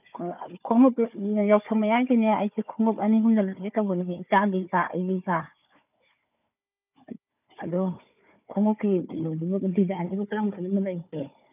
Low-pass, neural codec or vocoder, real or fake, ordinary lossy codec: 3.6 kHz; codec, 16 kHz, 4 kbps, FunCodec, trained on Chinese and English, 50 frames a second; fake; none